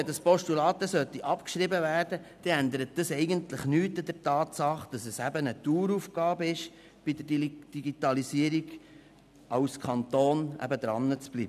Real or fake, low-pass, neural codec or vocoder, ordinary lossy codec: real; 14.4 kHz; none; none